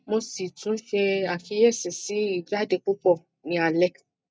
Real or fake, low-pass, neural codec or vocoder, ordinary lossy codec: real; none; none; none